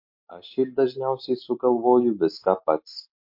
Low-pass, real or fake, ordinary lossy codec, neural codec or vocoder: 5.4 kHz; real; MP3, 32 kbps; none